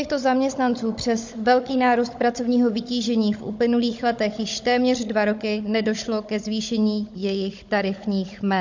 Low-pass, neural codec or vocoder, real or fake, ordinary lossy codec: 7.2 kHz; codec, 16 kHz, 16 kbps, FunCodec, trained on Chinese and English, 50 frames a second; fake; MP3, 48 kbps